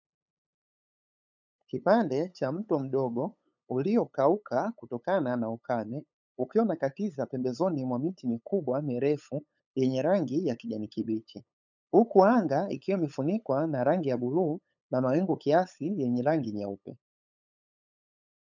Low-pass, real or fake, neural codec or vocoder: 7.2 kHz; fake; codec, 16 kHz, 8 kbps, FunCodec, trained on LibriTTS, 25 frames a second